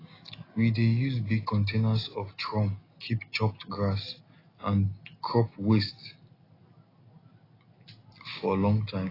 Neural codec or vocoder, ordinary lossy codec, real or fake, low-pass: none; AAC, 24 kbps; real; 5.4 kHz